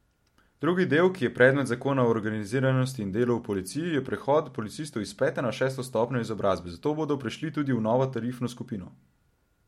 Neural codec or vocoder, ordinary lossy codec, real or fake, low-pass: none; MP3, 64 kbps; real; 19.8 kHz